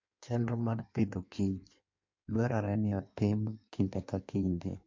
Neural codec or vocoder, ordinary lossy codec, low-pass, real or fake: codec, 16 kHz in and 24 kHz out, 1.1 kbps, FireRedTTS-2 codec; MP3, 48 kbps; 7.2 kHz; fake